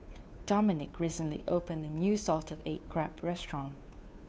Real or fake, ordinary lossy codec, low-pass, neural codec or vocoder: fake; none; none; codec, 16 kHz, 2 kbps, FunCodec, trained on Chinese and English, 25 frames a second